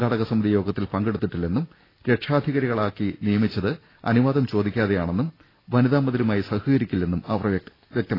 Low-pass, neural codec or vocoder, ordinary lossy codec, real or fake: 5.4 kHz; none; AAC, 24 kbps; real